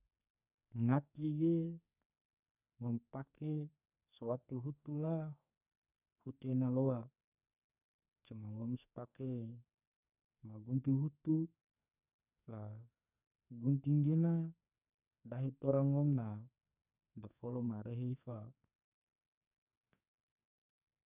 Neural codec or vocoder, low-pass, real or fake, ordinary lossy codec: codec, 44.1 kHz, 2.6 kbps, SNAC; 3.6 kHz; fake; none